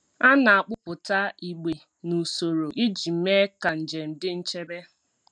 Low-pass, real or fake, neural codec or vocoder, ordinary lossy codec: 9.9 kHz; real; none; none